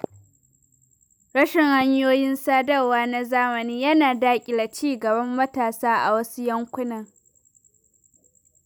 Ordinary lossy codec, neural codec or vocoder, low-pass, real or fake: none; none; none; real